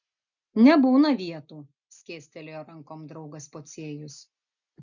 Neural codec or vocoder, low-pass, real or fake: none; 7.2 kHz; real